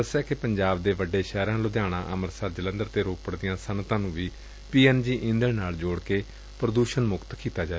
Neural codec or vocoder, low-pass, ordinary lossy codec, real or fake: none; none; none; real